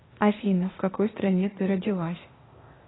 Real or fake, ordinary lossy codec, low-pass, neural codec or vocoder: fake; AAC, 16 kbps; 7.2 kHz; codec, 16 kHz, 0.8 kbps, ZipCodec